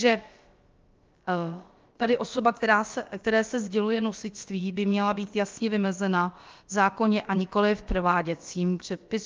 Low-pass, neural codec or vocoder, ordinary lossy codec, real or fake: 7.2 kHz; codec, 16 kHz, about 1 kbps, DyCAST, with the encoder's durations; Opus, 24 kbps; fake